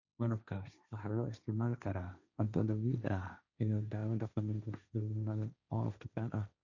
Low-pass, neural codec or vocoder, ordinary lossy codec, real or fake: 7.2 kHz; codec, 16 kHz, 1.1 kbps, Voila-Tokenizer; none; fake